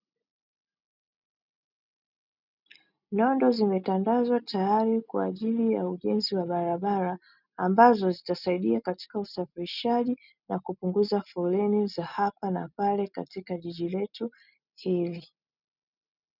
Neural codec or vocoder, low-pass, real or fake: none; 5.4 kHz; real